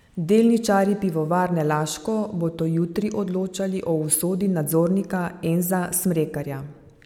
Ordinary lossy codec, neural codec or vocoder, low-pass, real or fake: none; none; 19.8 kHz; real